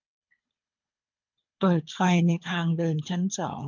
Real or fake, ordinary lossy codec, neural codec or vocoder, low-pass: fake; MP3, 64 kbps; codec, 24 kHz, 3 kbps, HILCodec; 7.2 kHz